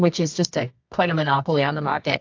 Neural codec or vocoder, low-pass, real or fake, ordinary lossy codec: codec, 24 kHz, 0.9 kbps, WavTokenizer, medium music audio release; 7.2 kHz; fake; AAC, 48 kbps